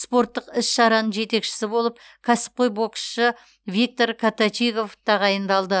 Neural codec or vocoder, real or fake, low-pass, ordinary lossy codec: none; real; none; none